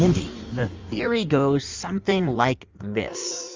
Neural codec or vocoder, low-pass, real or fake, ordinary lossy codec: codec, 16 kHz in and 24 kHz out, 1.1 kbps, FireRedTTS-2 codec; 7.2 kHz; fake; Opus, 32 kbps